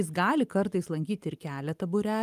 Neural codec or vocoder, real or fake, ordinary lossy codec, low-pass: none; real; Opus, 32 kbps; 14.4 kHz